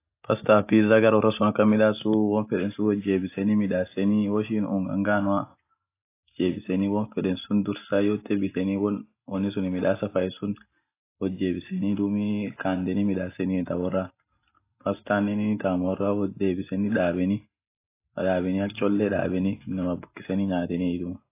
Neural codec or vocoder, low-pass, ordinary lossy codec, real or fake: none; 3.6 kHz; AAC, 24 kbps; real